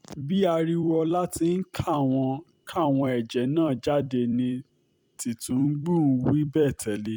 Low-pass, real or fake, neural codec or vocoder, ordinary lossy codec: 19.8 kHz; fake; vocoder, 44.1 kHz, 128 mel bands every 256 samples, BigVGAN v2; none